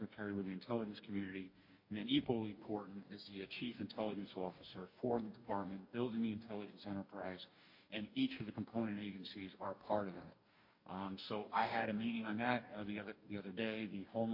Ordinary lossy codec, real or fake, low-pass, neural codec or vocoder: MP3, 32 kbps; fake; 5.4 kHz; codec, 44.1 kHz, 2.6 kbps, DAC